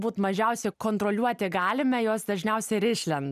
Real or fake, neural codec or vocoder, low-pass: real; none; 14.4 kHz